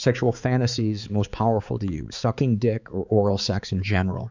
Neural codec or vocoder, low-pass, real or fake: codec, 16 kHz, 4 kbps, X-Codec, HuBERT features, trained on balanced general audio; 7.2 kHz; fake